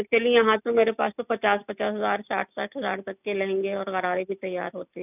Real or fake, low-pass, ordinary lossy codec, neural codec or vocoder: real; 3.6 kHz; none; none